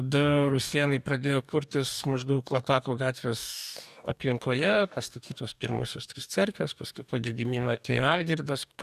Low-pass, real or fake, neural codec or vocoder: 14.4 kHz; fake; codec, 44.1 kHz, 2.6 kbps, DAC